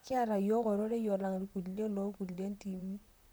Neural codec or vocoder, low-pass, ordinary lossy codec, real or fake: vocoder, 44.1 kHz, 128 mel bands, Pupu-Vocoder; none; none; fake